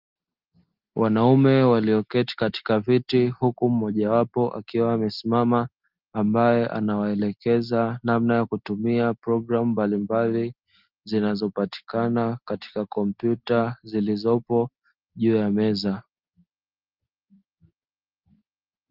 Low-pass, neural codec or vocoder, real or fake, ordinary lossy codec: 5.4 kHz; none; real; Opus, 32 kbps